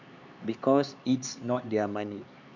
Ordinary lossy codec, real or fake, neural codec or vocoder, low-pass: none; fake; codec, 16 kHz, 4 kbps, X-Codec, HuBERT features, trained on LibriSpeech; 7.2 kHz